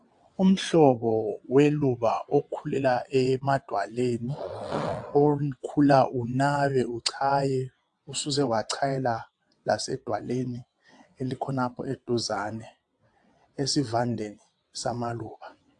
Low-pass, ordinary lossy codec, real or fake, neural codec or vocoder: 9.9 kHz; AAC, 64 kbps; fake; vocoder, 22.05 kHz, 80 mel bands, WaveNeXt